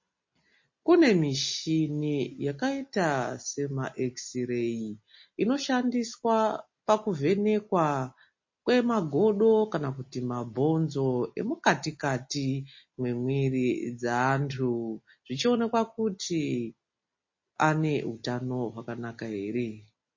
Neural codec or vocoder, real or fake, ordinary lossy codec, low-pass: none; real; MP3, 32 kbps; 7.2 kHz